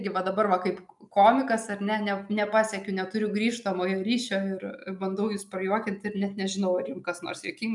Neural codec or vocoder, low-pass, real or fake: none; 10.8 kHz; real